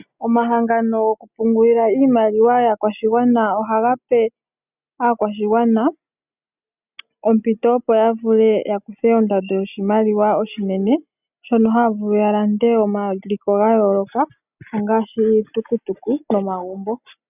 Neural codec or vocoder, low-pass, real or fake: none; 3.6 kHz; real